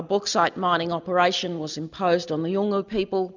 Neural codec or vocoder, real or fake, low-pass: none; real; 7.2 kHz